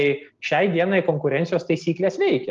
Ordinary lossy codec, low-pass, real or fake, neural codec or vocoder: Opus, 32 kbps; 7.2 kHz; real; none